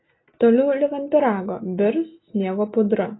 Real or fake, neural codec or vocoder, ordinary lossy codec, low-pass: real; none; AAC, 16 kbps; 7.2 kHz